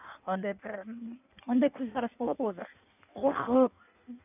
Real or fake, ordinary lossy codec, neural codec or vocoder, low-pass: fake; none; codec, 16 kHz in and 24 kHz out, 1.1 kbps, FireRedTTS-2 codec; 3.6 kHz